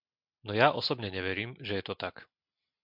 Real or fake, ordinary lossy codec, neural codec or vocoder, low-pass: real; MP3, 48 kbps; none; 5.4 kHz